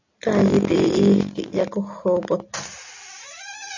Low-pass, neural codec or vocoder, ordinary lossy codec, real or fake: 7.2 kHz; none; AAC, 48 kbps; real